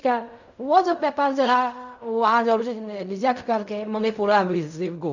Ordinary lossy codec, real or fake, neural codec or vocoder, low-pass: none; fake; codec, 16 kHz in and 24 kHz out, 0.4 kbps, LongCat-Audio-Codec, fine tuned four codebook decoder; 7.2 kHz